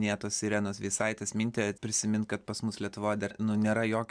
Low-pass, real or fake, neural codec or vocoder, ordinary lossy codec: 9.9 kHz; real; none; MP3, 96 kbps